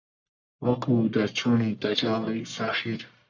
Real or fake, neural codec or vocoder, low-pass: fake; codec, 44.1 kHz, 1.7 kbps, Pupu-Codec; 7.2 kHz